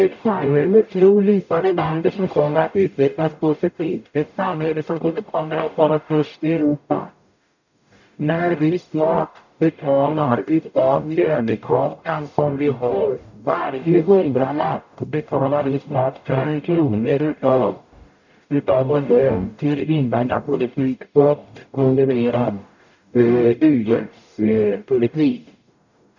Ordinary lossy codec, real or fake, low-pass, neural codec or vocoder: none; fake; 7.2 kHz; codec, 44.1 kHz, 0.9 kbps, DAC